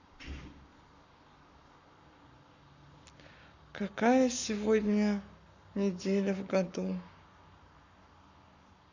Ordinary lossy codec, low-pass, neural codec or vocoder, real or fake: none; 7.2 kHz; codec, 44.1 kHz, 7.8 kbps, Pupu-Codec; fake